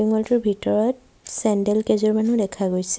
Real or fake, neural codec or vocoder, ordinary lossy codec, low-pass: real; none; none; none